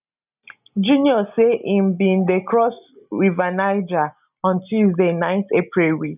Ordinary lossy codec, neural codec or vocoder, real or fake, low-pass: none; none; real; 3.6 kHz